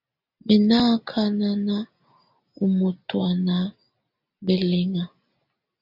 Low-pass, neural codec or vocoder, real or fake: 5.4 kHz; none; real